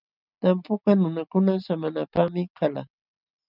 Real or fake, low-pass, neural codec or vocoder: real; 5.4 kHz; none